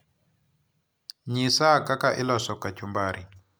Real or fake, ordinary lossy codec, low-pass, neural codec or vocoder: real; none; none; none